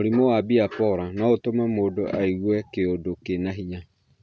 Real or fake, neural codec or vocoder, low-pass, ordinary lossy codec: real; none; none; none